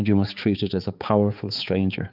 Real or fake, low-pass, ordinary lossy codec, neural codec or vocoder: fake; 5.4 kHz; Opus, 24 kbps; codec, 16 kHz, 4 kbps, X-Codec, HuBERT features, trained on balanced general audio